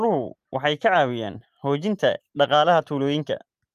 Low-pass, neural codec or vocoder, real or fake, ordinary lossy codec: 14.4 kHz; none; real; Opus, 32 kbps